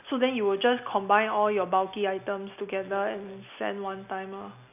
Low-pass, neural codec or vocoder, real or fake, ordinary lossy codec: 3.6 kHz; none; real; none